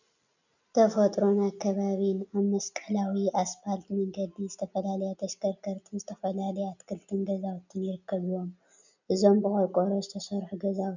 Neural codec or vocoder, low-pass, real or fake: none; 7.2 kHz; real